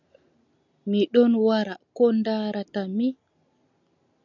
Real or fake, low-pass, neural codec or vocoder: real; 7.2 kHz; none